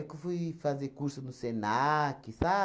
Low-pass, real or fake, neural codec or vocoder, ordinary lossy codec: none; real; none; none